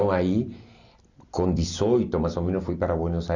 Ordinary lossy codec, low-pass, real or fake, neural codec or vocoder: none; 7.2 kHz; real; none